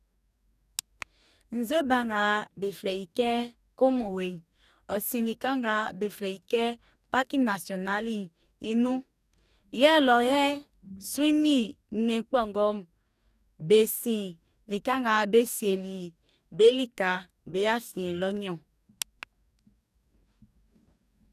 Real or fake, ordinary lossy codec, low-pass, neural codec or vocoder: fake; none; 14.4 kHz; codec, 44.1 kHz, 2.6 kbps, DAC